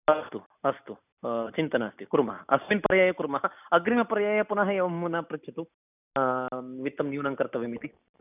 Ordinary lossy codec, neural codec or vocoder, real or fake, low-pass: none; none; real; 3.6 kHz